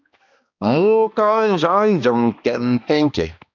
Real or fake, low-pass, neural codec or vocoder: fake; 7.2 kHz; codec, 16 kHz, 1 kbps, X-Codec, HuBERT features, trained on balanced general audio